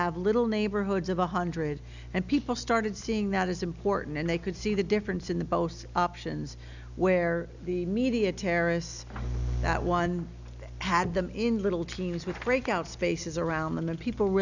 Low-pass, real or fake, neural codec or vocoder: 7.2 kHz; real; none